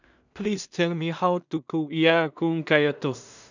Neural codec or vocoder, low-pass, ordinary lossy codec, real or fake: codec, 16 kHz in and 24 kHz out, 0.4 kbps, LongCat-Audio-Codec, two codebook decoder; 7.2 kHz; none; fake